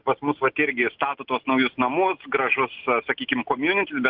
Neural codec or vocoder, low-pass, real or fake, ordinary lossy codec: none; 5.4 kHz; real; Opus, 24 kbps